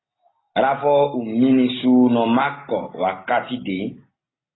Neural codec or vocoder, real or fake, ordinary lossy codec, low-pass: none; real; AAC, 16 kbps; 7.2 kHz